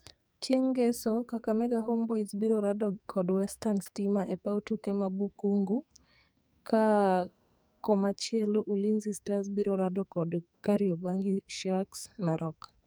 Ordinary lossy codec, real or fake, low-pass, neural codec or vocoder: none; fake; none; codec, 44.1 kHz, 2.6 kbps, SNAC